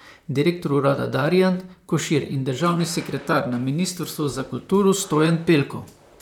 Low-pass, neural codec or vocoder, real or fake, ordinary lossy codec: 19.8 kHz; vocoder, 44.1 kHz, 128 mel bands, Pupu-Vocoder; fake; none